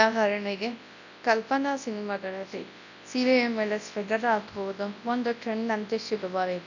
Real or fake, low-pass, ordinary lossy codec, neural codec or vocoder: fake; 7.2 kHz; none; codec, 24 kHz, 0.9 kbps, WavTokenizer, large speech release